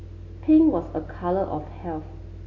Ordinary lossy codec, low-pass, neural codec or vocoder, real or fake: AAC, 32 kbps; 7.2 kHz; none; real